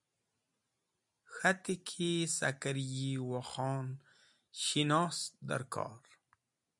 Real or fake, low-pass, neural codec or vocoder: real; 10.8 kHz; none